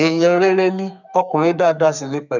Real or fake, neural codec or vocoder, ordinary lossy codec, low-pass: fake; codec, 32 kHz, 1.9 kbps, SNAC; none; 7.2 kHz